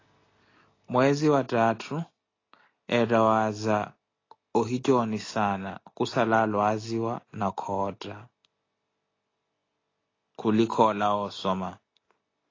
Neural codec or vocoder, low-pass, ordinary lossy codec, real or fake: none; 7.2 kHz; AAC, 32 kbps; real